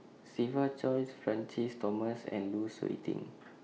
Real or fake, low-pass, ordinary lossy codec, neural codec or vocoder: real; none; none; none